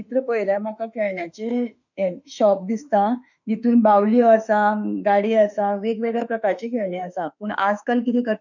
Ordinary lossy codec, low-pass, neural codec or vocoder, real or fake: none; 7.2 kHz; autoencoder, 48 kHz, 32 numbers a frame, DAC-VAE, trained on Japanese speech; fake